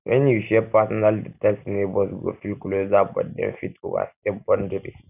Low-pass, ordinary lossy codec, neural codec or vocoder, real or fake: 3.6 kHz; none; none; real